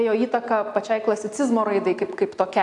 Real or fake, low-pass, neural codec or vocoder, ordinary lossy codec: real; 10.8 kHz; none; AAC, 64 kbps